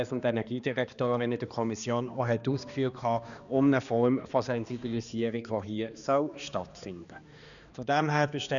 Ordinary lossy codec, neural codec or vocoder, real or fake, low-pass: none; codec, 16 kHz, 2 kbps, X-Codec, HuBERT features, trained on balanced general audio; fake; 7.2 kHz